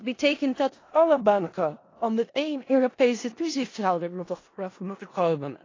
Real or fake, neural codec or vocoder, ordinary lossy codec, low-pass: fake; codec, 16 kHz in and 24 kHz out, 0.4 kbps, LongCat-Audio-Codec, four codebook decoder; AAC, 32 kbps; 7.2 kHz